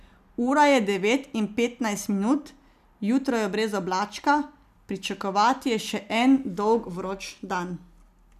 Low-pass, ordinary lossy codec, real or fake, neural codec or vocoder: 14.4 kHz; none; real; none